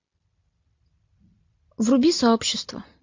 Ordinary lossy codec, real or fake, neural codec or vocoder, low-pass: MP3, 48 kbps; real; none; 7.2 kHz